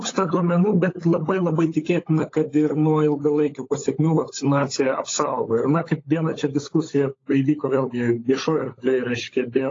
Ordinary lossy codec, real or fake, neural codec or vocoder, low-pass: AAC, 32 kbps; fake; codec, 16 kHz, 16 kbps, FunCodec, trained on LibriTTS, 50 frames a second; 7.2 kHz